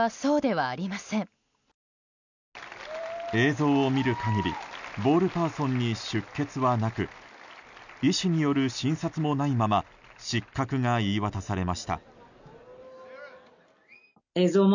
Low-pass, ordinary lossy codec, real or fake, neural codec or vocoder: 7.2 kHz; none; real; none